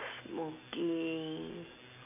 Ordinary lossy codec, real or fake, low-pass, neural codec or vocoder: none; real; 3.6 kHz; none